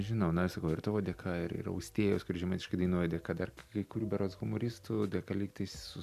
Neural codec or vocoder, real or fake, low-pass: none; real; 14.4 kHz